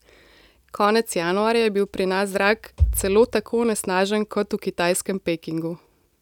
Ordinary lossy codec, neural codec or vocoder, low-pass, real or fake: none; none; 19.8 kHz; real